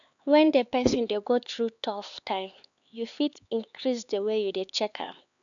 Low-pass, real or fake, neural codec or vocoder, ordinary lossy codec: 7.2 kHz; fake; codec, 16 kHz, 4 kbps, X-Codec, HuBERT features, trained on LibriSpeech; none